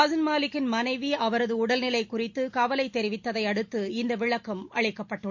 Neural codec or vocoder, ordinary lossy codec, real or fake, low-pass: none; MP3, 48 kbps; real; 7.2 kHz